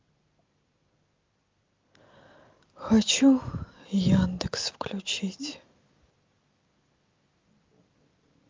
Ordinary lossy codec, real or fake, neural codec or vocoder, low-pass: Opus, 24 kbps; real; none; 7.2 kHz